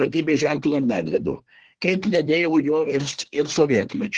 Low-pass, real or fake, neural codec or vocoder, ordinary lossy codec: 9.9 kHz; fake; codec, 24 kHz, 1 kbps, SNAC; Opus, 16 kbps